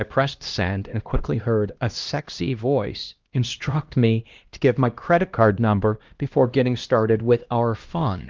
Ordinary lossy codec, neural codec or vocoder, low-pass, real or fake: Opus, 32 kbps; codec, 16 kHz, 1 kbps, X-Codec, HuBERT features, trained on LibriSpeech; 7.2 kHz; fake